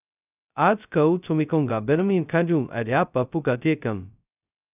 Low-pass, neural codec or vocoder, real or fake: 3.6 kHz; codec, 16 kHz, 0.2 kbps, FocalCodec; fake